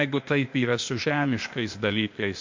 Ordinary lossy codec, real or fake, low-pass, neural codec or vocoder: MP3, 48 kbps; fake; 7.2 kHz; codec, 16 kHz, 0.8 kbps, ZipCodec